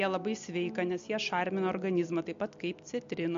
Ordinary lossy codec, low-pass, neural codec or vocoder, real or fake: MP3, 48 kbps; 7.2 kHz; none; real